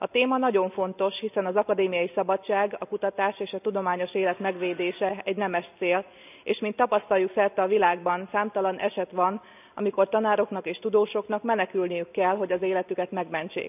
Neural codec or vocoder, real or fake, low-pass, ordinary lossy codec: none; real; 3.6 kHz; none